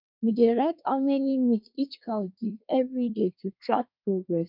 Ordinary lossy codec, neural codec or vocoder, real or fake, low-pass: none; codec, 24 kHz, 1 kbps, SNAC; fake; 5.4 kHz